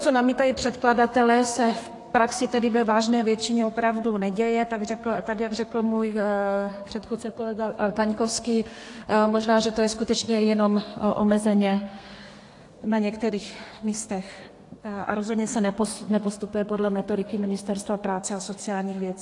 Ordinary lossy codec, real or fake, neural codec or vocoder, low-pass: AAC, 48 kbps; fake; codec, 32 kHz, 1.9 kbps, SNAC; 10.8 kHz